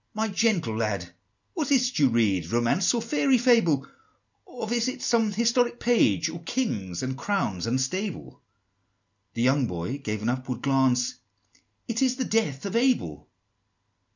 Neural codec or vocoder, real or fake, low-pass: none; real; 7.2 kHz